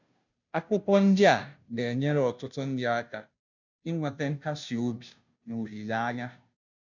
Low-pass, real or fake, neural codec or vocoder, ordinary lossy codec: 7.2 kHz; fake; codec, 16 kHz, 0.5 kbps, FunCodec, trained on Chinese and English, 25 frames a second; none